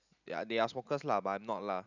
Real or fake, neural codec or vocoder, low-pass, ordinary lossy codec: real; none; 7.2 kHz; none